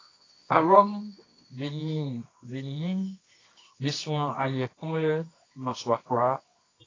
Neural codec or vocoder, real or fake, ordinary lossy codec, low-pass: codec, 24 kHz, 0.9 kbps, WavTokenizer, medium music audio release; fake; AAC, 32 kbps; 7.2 kHz